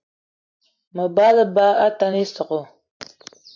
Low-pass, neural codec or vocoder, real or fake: 7.2 kHz; vocoder, 44.1 kHz, 128 mel bands every 512 samples, BigVGAN v2; fake